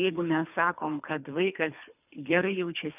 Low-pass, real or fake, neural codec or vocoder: 3.6 kHz; fake; codec, 24 kHz, 3 kbps, HILCodec